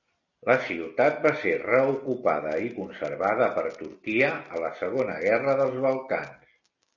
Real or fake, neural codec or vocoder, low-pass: real; none; 7.2 kHz